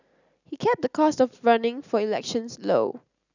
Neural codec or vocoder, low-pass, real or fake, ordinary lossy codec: none; 7.2 kHz; real; none